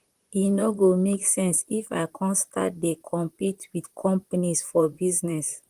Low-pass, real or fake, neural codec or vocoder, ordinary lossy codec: 14.4 kHz; fake; vocoder, 44.1 kHz, 128 mel bands, Pupu-Vocoder; Opus, 24 kbps